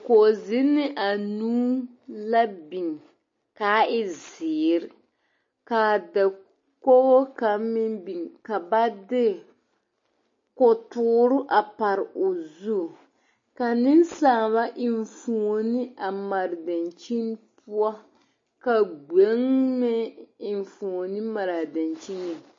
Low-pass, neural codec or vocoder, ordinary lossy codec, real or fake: 7.2 kHz; none; MP3, 32 kbps; real